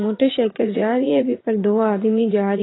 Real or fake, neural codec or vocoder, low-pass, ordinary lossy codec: fake; vocoder, 44.1 kHz, 80 mel bands, Vocos; 7.2 kHz; AAC, 16 kbps